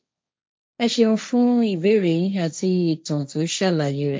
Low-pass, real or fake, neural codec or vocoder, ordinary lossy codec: none; fake; codec, 16 kHz, 1.1 kbps, Voila-Tokenizer; none